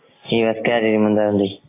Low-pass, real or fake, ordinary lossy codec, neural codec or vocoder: 3.6 kHz; real; AAC, 16 kbps; none